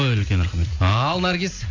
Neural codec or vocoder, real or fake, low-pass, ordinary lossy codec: none; real; 7.2 kHz; AAC, 48 kbps